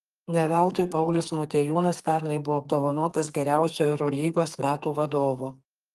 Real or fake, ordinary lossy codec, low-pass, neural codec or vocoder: fake; Opus, 32 kbps; 14.4 kHz; codec, 32 kHz, 1.9 kbps, SNAC